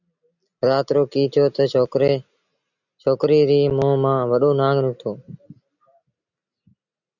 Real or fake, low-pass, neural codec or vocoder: real; 7.2 kHz; none